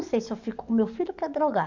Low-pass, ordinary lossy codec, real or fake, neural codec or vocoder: 7.2 kHz; none; fake; codec, 44.1 kHz, 7.8 kbps, DAC